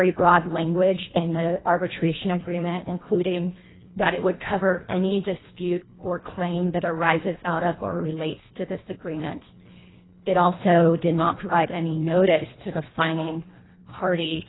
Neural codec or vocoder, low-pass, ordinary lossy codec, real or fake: codec, 24 kHz, 1.5 kbps, HILCodec; 7.2 kHz; AAC, 16 kbps; fake